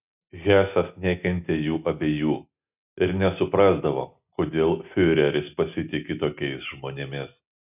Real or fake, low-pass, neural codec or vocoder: real; 3.6 kHz; none